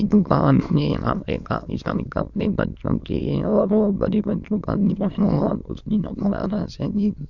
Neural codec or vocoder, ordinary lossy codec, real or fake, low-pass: autoencoder, 22.05 kHz, a latent of 192 numbers a frame, VITS, trained on many speakers; MP3, 64 kbps; fake; 7.2 kHz